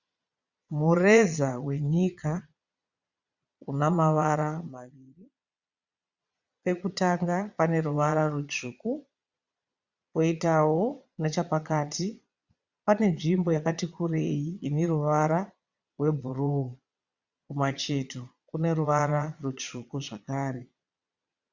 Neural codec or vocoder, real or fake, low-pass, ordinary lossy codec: vocoder, 22.05 kHz, 80 mel bands, Vocos; fake; 7.2 kHz; Opus, 64 kbps